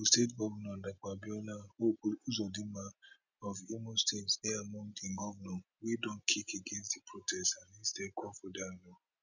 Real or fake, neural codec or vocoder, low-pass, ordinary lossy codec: real; none; 7.2 kHz; none